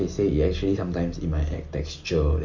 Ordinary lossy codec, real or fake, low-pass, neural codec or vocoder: Opus, 64 kbps; real; 7.2 kHz; none